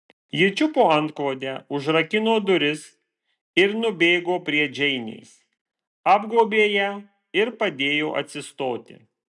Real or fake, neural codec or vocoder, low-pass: real; none; 10.8 kHz